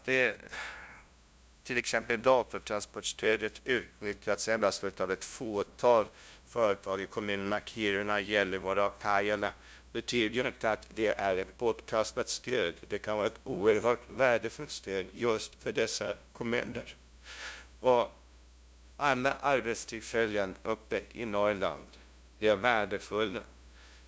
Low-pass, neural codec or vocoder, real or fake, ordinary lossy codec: none; codec, 16 kHz, 0.5 kbps, FunCodec, trained on LibriTTS, 25 frames a second; fake; none